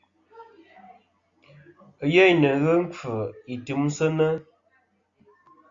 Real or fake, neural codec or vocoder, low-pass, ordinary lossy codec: real; none; 7.2 kHz; Opus, 64 kbps